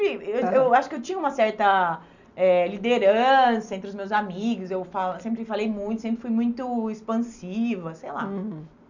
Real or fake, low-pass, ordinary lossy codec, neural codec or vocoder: real; 7.2 kHz; none; none